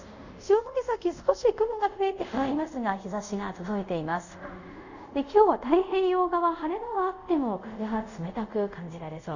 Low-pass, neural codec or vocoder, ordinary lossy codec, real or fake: 7.2 kHz; codec, 24 kHz, 0.5 kbps, DualCodec; none; fake